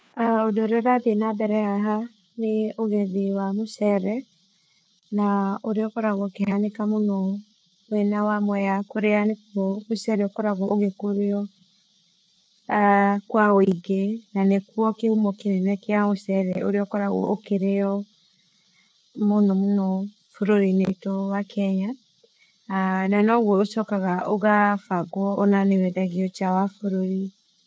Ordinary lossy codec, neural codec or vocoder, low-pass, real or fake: none; codec, 16 kHz, 4 kbps, FreqCodec, larger model; none; fake